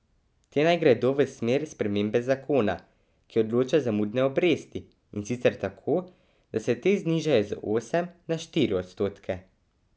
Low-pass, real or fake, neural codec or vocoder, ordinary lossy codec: none; real; none; none